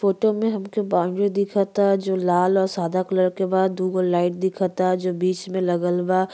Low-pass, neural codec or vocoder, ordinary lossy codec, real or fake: none; none; none; real